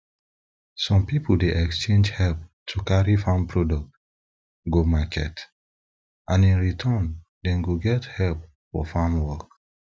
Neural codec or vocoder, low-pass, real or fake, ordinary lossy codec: none; none; real; none